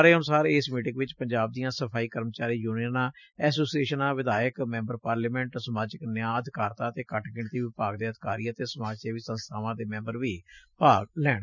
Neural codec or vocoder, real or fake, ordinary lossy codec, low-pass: none; real; none; 7.2 kHz